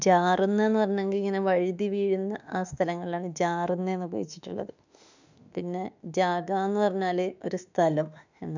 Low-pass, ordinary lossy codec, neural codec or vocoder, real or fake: 7.2 kHz; none; autoencoder, 48 kHz, 32 numbers a frame, DAC-VAE, trained on Japanese speech; fake